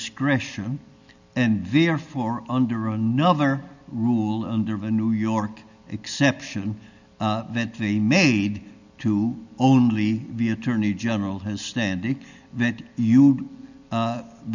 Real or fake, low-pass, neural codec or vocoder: real; 7.2 kHz; none